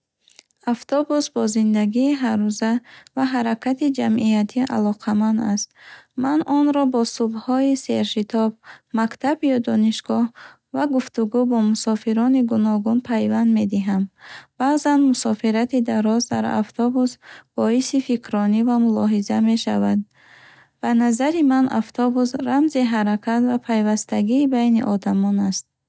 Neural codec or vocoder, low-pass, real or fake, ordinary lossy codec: none; none; real; none